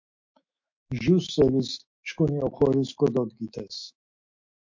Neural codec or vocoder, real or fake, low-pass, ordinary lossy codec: autoencoder, 48 kHz, 128 numbers a frame, DAC-VAE, trained on Japanese speech; fake; 7.2 kHz; MP3, 48 kbps